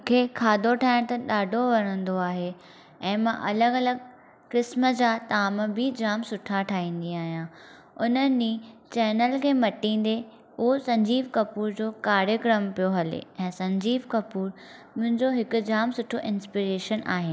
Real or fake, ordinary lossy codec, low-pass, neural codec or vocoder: real; none; none; none